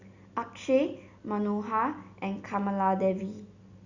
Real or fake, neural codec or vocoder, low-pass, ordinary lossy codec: real; none; 7.2 kHz; Opus, 64 kbps